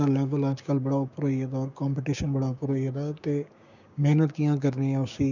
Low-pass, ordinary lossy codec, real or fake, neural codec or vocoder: 7.2 kHz; none; fake; codec, 16 kHz, 6 kbps, DAC